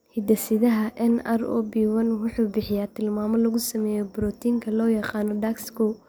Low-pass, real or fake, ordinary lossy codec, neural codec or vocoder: none; real; none; none